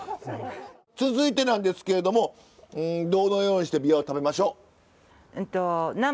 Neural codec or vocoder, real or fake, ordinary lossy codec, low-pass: none; real; none; none